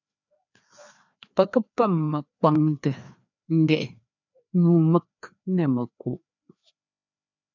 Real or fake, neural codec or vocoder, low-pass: fake; codec, 16 kHz, 2 kbps, FreqCodec, larger model; 7.2 kHz